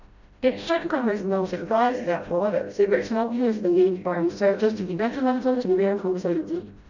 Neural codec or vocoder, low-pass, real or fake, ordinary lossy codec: codec, 16 kHz, 0.5 kbps, FreqCodec, smaller model; 7.2 kHz; fake; none